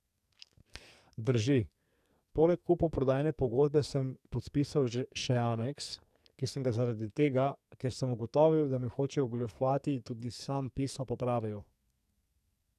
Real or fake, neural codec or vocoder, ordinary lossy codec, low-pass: fake; codec, 44.1 kHz, 2.6 kbps, SNAC; none; 14.4 kHz